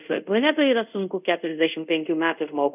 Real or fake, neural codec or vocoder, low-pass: fake; codec, 24 kHz, 0.5 kbps, DualCodec; 3.6 kHz